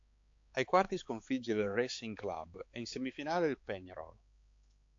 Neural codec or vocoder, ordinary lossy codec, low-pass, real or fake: codec, 16 kHz, 4 kbps, X-Codec, HuBERT features, trained on balanced general audio; AAC, 48 kbps; 7.2 kHz; fake